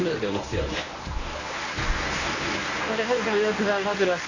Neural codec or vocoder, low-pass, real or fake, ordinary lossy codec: codec, 24 kHz, 0.9 kbps, WavTokenizer, medium speech release version 1; 7.2 kHz; fake; none